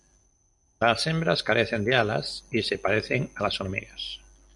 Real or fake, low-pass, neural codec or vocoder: real; 10.8 kHz; none